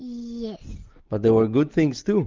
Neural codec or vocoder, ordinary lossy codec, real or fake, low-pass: none; Opus, 32 kbps; real; 7.2 kHz